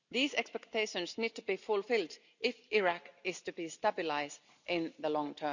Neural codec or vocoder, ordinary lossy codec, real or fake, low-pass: none; none; real; 7.2 kHz